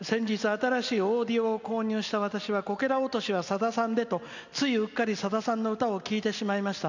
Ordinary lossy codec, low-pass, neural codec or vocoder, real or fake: none; 7.2 kHz; none; real